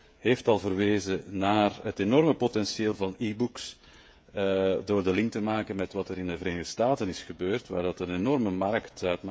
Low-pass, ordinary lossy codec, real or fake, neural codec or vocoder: none; none; fake; codec, 16 kHz, 16 kbps, FreqCodec, smaller model